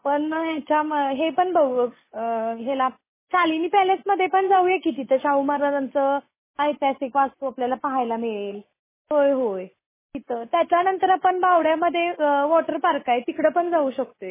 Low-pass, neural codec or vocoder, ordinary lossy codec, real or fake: 3.6 kHz; none; MP3, 16 kbps; real